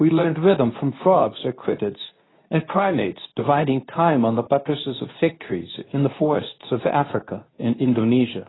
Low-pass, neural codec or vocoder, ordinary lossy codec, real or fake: 7.2 kHz; codec, 24 kHz, 0.9 kbps, WavTokenizer, medium speech release version 2; AAC, 16 kbps; fake